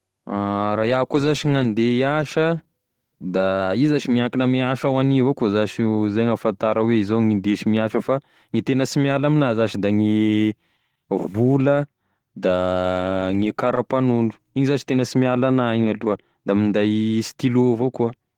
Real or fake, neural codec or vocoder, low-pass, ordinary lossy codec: fake; autoencoder, 48 kHz, 128 numbers a frame, DAC-VAE, trained on Japanese speech; 19.8 kHz; Opus, 16 kbps